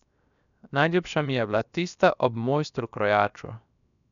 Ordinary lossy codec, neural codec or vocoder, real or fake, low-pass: none; codec, 16 kHz, 0.7 kbps, FocalCodec; fake; 7.2 kHz